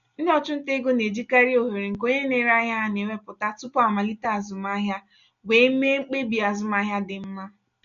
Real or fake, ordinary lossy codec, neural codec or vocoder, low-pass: real; none; none; 7.2 kHz